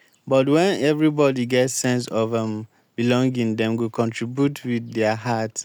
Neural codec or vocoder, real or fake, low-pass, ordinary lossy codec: none; real; none; none